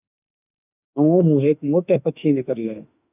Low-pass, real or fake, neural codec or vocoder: 3.6 kHz; fake; autoencoder, 48 kHz, 32 numbers a frame, DAC-VAE, trained on Japanese speech